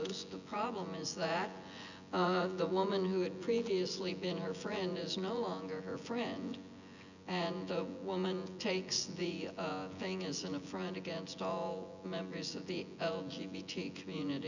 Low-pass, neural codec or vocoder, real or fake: 7.2 kHz; vocoder, 24 kHz, 100 mel bands, Vocos; fake